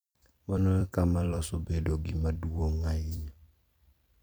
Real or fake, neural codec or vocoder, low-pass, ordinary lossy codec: fake; vocoder, 44.1 kHz, 128 mel bands every 256 samples, BigVGAN v2; none; none